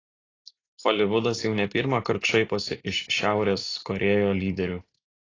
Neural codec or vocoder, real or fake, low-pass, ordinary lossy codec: none; real; 7.2 kHz; AAC, 32 kbps